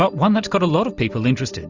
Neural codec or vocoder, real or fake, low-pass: none; real; 7.2 kHz